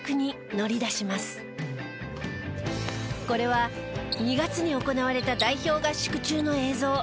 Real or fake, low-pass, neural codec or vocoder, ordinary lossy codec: real; none; none; none